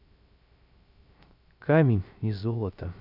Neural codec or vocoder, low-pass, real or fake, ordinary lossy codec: codec, 16 kHz, 0.3 kbps, FocalCodec; 5.4 kHz; fake; none